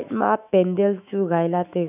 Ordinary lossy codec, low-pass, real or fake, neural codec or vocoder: none; 3.6 kHz; fake; autoencoder, 48 kHz, 128 numbers a frame, DAC-VAE, trained on Japanese speech